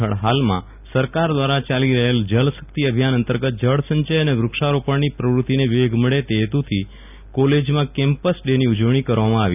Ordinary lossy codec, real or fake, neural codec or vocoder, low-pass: none; real; none; 3.6 kHz